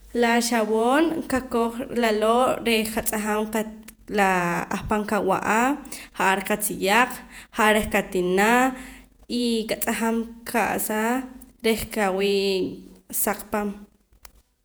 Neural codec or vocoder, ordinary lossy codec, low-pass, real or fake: vocoder, 48 kHz, 128 mel bands, Vocos; none; none; fake